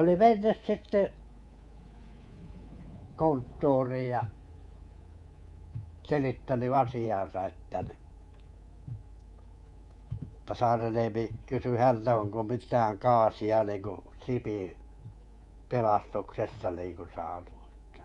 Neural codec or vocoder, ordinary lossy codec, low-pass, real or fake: codec, 24 kHz, 3.1 kbps, DualCodec; Opus, 64 kbps; 10.8 kHz; fake